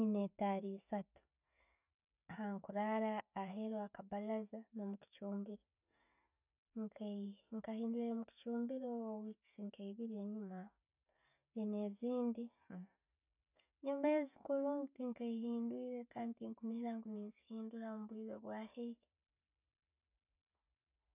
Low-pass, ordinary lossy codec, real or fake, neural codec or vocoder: 3.6 kHz; none; real; none